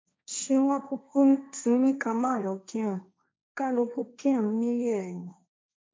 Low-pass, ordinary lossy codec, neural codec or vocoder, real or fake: none; none; codec, 16 kHz, 1.1 kbps, Voila-Tokenizer; fake